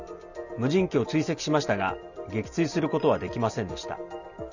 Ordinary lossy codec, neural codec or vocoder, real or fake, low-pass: none; none; real; 7.2 kHz